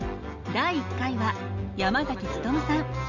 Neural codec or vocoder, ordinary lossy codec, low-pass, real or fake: none; none; 7.2 kHz; real